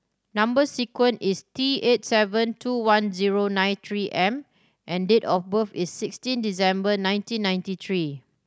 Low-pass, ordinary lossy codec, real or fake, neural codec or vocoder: none; none; real; none